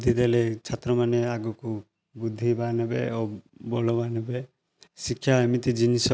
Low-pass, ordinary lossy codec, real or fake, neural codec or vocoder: none; none; real; none